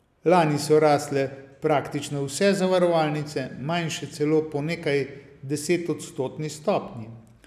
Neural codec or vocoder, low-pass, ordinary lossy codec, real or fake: none; 14.4 kHz; none; real